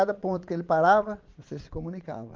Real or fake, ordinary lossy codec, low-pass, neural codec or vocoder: fake; Opus, 32 kbps; 7.2 kHz; vocoder, 22.05 kHz, 80 mel bands, Vocos